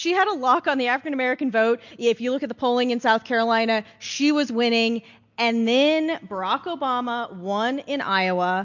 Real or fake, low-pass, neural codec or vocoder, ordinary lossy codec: real; 7.2 kHz; none; MP3, 48 kbps